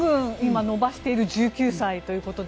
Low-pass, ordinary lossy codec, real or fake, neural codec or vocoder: none; none; real; none